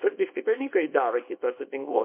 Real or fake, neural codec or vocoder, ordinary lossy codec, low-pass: fake; codec, 24 kHz, 0.9 kbps, WavTokenizer, small release; MP3, 24 kbps; 3.6 kHz